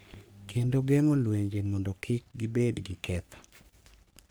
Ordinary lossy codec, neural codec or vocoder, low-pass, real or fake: none; codec, 44.1 kHz, 3.4 kbps, Pupu-Codec; none; fake